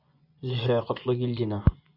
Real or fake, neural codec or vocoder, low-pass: fake; vocoder, 24 kHz, 100 mel bands, Vocos; 5.4 kHz